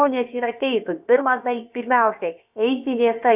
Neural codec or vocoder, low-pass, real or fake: codec, 16 kHz, about 1 kbps, DyCAST, with the encoder's durations; 3.6 kHz; fake